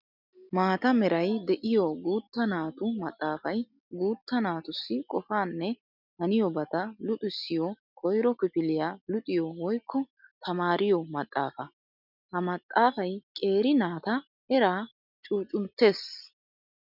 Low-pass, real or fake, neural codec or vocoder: 5.4 kHz; real; none